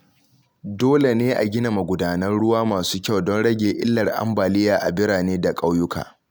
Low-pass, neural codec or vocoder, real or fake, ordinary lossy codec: none; none; real; none